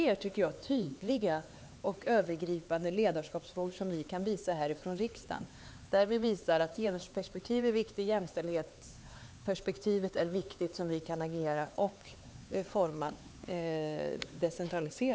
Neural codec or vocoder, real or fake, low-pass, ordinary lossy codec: codec, 16 kHz, 4 kbps, X-Codec, HuBERT features, trained on LibriSpeech; fake; none; none